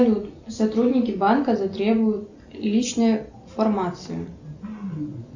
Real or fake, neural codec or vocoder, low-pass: real; none; 7.2 kHz